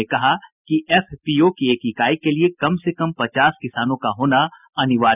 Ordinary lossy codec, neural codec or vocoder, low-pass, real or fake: none; none; 3.6 kHz; real